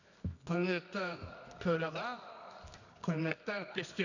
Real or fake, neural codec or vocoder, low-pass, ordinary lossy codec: fake; codec, 24 kHz, 0.9 kbps, WavTokenizer, medium music audio release; 7.2 kHz; none